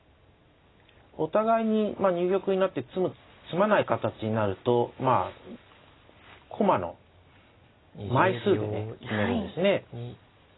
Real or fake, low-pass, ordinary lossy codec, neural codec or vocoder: real; 7.2 kHz; AAC, 16 kbps; none